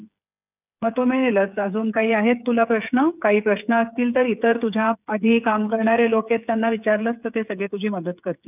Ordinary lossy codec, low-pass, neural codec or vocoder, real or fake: none; 3.6 kHz; codec, 16 kHz, 8 kbps, FreqCodec, smaller model; fake